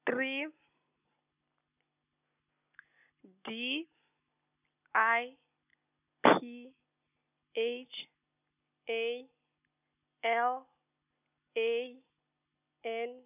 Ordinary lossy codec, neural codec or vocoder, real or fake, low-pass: none; none; real; 3.6 kHz